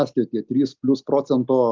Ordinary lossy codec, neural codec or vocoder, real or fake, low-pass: Opus, 32 kbps; none; real; 7.2 kHz